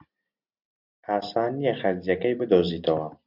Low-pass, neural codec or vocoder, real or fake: 5.4 kHz; none; real